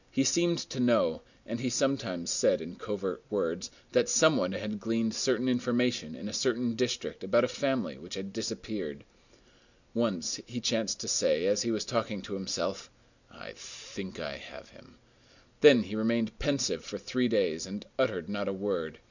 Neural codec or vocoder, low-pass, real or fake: none; 7.2 kHz; real